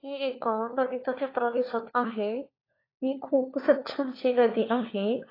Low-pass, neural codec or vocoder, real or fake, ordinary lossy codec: 5.4 kHz; codec, 16 kHz, 4 kbps, FunCodec, trained on LibriTTS, 50 frames a second; fake; AAC, 24 kbps